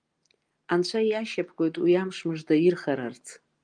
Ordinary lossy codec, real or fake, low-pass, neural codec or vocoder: Opus, 32 kbps; real; 9.9 kHz; none